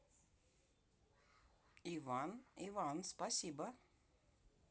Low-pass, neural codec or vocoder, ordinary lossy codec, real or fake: none; none; none; real